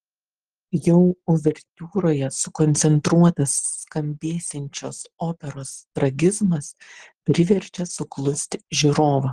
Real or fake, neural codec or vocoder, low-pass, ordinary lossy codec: real; none; 9.9 kHz; Opus, 16 kbps